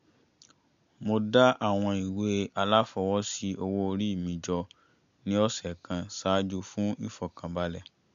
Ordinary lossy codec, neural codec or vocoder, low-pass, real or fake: MP3, 64 kbps; none; 7.2 kHz; real